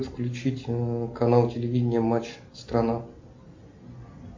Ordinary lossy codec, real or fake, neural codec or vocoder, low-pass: MP3, 48 kbps; real; none; 7.2 kHz